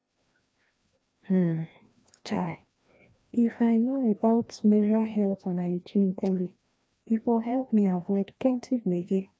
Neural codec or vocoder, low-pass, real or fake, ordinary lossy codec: codec, 16 kHz, 1 kbps, FreqCodec, larger model; none; fake; none